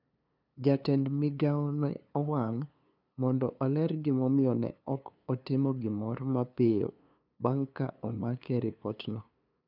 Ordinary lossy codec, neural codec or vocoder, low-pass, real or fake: none; codec, 16 kHz, 2 kbps, FunCodec, trained on LibriTTS, 25 frames a second; 5.4 kHz; fake